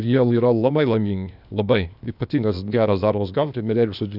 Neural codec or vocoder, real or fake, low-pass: codec, 16 kHz, 0.8 kbps, ZipCodec; fake; 5.4 kHz